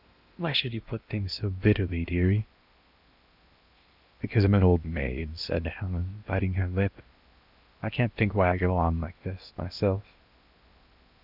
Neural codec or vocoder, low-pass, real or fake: codec, 16 kHz in and 24 kHz out, 0.8 kbps, FocalCodec, streaming, 65536 codes; 5.4 kHz; fake